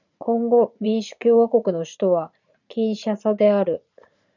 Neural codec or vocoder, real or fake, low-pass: vocoder, 44.1 kHz, 80 mel bands, Vocos; fake; 7.2 kHz